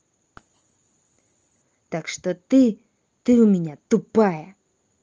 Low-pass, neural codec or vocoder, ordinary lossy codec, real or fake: 7.2 kHz; none; Opus, 24 kbps; real